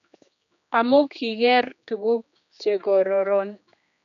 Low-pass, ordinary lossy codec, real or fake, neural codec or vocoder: 7.2 kHz; none; fake; codec, 16 kHz, 2 kbps, X-Codec, HuBERT features, trained on general audio